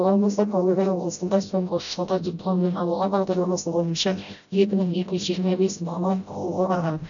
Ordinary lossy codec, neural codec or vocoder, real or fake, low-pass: none; codec, 16 kHz, 0.5 kbps, FreqCodec, smaller model; fake; 7.2 kHz